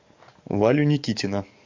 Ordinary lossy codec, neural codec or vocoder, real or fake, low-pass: MP3, 48 kbps; codec, 44.1 kHz, 7.8 kbps, DAC; fake; 7.2 kHz